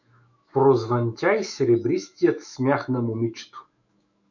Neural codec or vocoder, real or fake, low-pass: autoencoder, 48 kHz, 128 numbers a frame, DAC-VAE, trained on Japanese speech; fake; 7.2 kHz